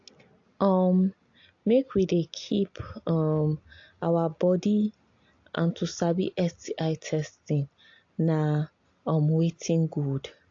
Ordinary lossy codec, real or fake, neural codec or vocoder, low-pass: AAC, 48 kbps; real; none; 7.2 kHz